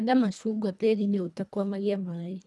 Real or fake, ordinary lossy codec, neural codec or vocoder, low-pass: fake; none; codec, 24 kHz, 1.5 kbps, HILCodec; none